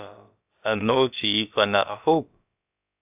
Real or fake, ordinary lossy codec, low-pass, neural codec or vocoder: fake; AAC, 32 kbps; 3.6 kHz; codec, 16 kHz, about 1 kbps, DyCAST, with the encoder's durations